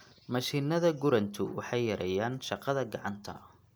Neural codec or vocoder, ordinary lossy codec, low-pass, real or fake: none; none; none; real